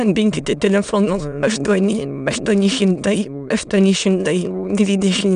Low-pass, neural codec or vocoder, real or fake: 9.9 kHz; autoencoder, 22.05 kHz, a latent of 192 numbers a frame, VITS, trained on many speakers; fake